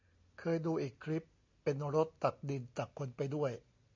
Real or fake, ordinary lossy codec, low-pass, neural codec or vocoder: real; MP3, 48 kbps; 7.2 kHz; none